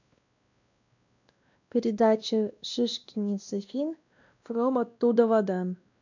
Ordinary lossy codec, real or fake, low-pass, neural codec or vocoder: none; fake; 7.2 kHz; codec, 16 kHz, 1 kbps, X-Codec, WavLM features, trained on Multilingual LibriSpeech